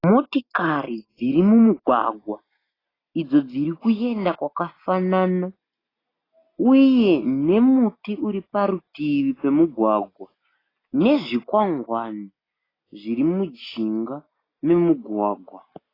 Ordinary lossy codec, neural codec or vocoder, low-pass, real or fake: AAC, 24 kbps; none; 5.4 kHz; real